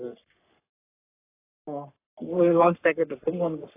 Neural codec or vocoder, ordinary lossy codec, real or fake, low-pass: codec, 44.1 kHz, 3.4 kbps, Pupu-Codec; AAC, 24 kbps; fake; 3.6 kHz